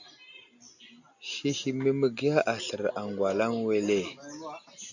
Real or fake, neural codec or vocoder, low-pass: real; none; 7.2 kHz